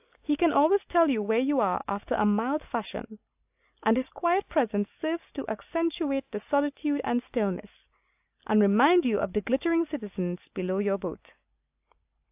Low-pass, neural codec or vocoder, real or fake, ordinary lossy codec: 3.6 kHz; none; real; AAC, 32 kbps